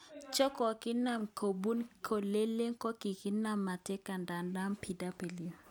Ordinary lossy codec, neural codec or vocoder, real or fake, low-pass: none; none; real; none